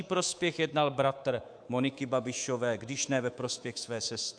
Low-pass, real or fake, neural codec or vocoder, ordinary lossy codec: 9.9 kHz; fake; codec, 24 kHz, 3.1 kbps, DualCodec; AAC, 64 kbps